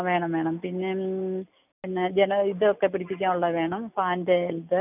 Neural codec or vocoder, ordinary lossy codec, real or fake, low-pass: none; none; real; 3.6 kHz